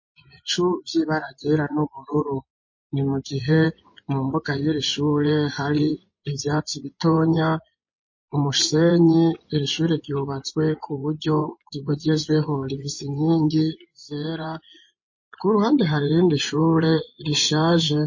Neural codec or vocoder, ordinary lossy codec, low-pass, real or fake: vocoder, 24 kHz, 100 mel bands, Vocos; MP3, 32 kbps; 7.2 kHz; fake